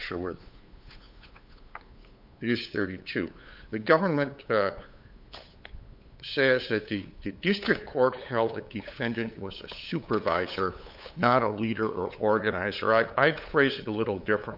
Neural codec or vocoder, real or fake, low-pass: codec, 16 kHz, 8 kbps, FunCodec, trained on LibriTTS, 25 frames a second; fake; 5.4 kHz